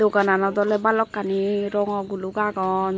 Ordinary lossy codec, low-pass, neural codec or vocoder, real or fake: none; none; none; real